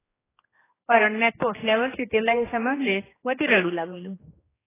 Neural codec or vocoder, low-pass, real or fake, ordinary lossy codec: codec, 16 kHz, 2 kbps, X-Codec, HuBERT features, trained on general audio; 3.6 kHz; fake; AAC, 16 kbps